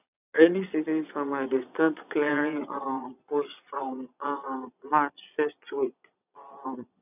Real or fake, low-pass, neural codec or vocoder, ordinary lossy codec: fake; 3.6 kHz; vocoder, 22.05 kHz, 80 mel bands, Vocos; none